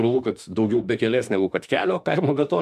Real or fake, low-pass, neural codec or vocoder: fake; 14.4 kHz; autoencoder, 48 kHz, 32 numbers a frame, DAC-VAE, trained on Japanese speech